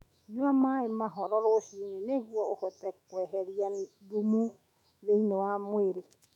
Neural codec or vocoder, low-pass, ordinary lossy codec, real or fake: autoencoder, 48 kHz, 128 numbers a frame, DAC-VAE, trained on Japanese speech; 19.8 kHz; none; fake